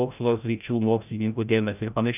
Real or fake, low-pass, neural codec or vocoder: fake; 3.6 kHz; codec, 16 kHz, 0.5 kbps, FreqCodec, larger model